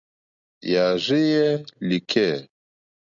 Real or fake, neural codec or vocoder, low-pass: real; none; 7.2 kHz